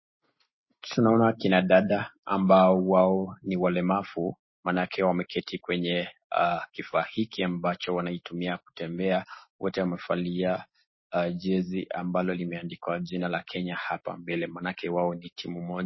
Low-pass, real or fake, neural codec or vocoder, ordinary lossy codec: 7.2 kHz; real; none; MP3, 24 kbps